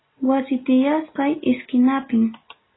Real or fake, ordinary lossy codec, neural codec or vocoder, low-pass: real; AAC, 16 kbps; none; 7.2 kHz